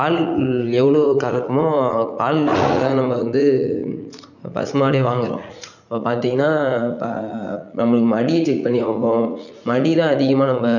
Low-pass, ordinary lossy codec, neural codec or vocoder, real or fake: 7.2 kHz; none; vocoder, 44.1 kHz, 80 mel bands, Vocos; fake